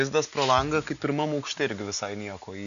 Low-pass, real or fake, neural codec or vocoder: 7.2 kHz; real; none